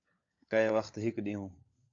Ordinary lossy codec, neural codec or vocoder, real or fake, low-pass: AAC, 48 kbps; codec, 16 kHz, 16 kbps, FunCodec, trained on LibriTTS, 50 frames a second; fake; 7.2 kHz